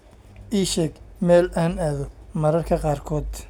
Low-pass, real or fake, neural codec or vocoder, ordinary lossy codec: 19.8 kHz; real; none; none